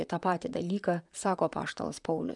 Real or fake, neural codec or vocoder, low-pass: fake; vocoder, 44.1 kHz, 128 mel bands, Pupu-Vocoder; 10.8 kHz